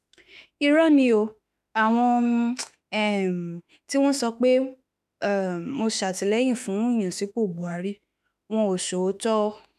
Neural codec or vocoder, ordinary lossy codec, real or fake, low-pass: autoencoder, 48 kHz, 32 numbers a frame, DAC-VAE, trained on Japanese speech; none; fake; 14.4 kHz